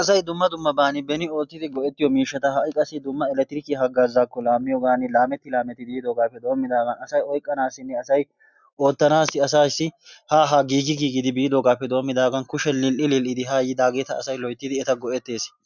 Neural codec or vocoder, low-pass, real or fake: none; 7.2 kHz; real